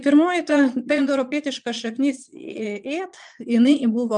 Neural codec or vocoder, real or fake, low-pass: vocoder, 22.05 kHz, 80 mel bands, Vocos; fake; 9.9 kHz